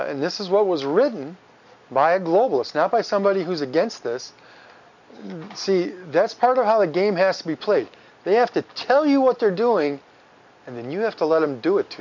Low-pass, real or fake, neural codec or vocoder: 7.2 kHz; real; none